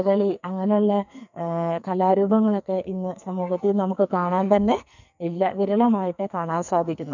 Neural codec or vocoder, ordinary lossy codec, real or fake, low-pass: codec, 44.1 kHz, 2.6 kbps, SNAC; none; fake; 7.2 kHz